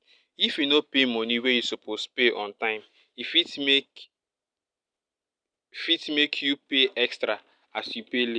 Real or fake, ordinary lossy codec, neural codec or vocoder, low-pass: real; none; none; 9.9 kHz